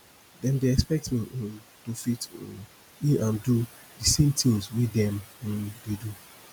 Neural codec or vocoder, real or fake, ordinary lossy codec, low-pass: none; real; none; none